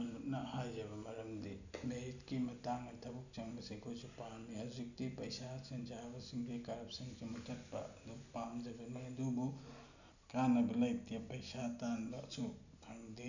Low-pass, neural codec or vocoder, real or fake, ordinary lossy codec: 7.2 kHz; none; real; none